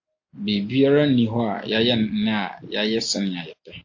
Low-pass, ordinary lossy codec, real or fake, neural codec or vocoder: 7.2 kHz; AAC, 48 kbps; real; none